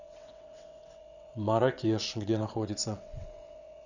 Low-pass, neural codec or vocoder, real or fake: 7.2 kHz; vocoder, 22.05 kHz, 80 mel bands, WaveNeXt; fake